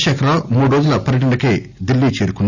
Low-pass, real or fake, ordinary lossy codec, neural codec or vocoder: 7.2 kHz; real; none; none